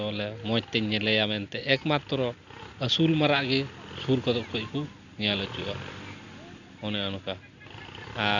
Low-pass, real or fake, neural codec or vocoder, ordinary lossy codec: 7.2 kHz; real; none; none